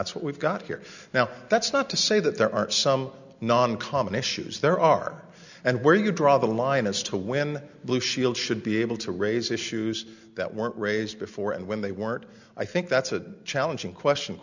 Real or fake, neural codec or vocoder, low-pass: real; none; 7.2 kHz